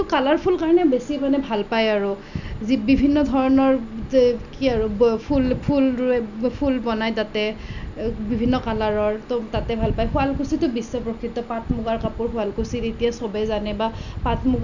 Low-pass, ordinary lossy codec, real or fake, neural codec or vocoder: 7.2 kHz; none; real; none